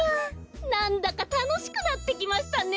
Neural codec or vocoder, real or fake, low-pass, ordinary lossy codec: none; real; none; none